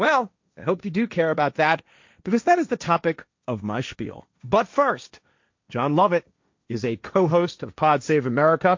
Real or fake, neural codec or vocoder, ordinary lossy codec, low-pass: fake; codec, 16 kHz, 1.1 kbps, Voila-Tokenizer; MP3, 48 kbps; 7.2 kHz